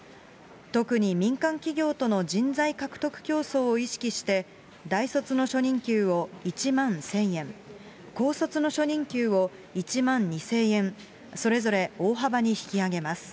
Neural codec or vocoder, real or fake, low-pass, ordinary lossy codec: none; real; none; none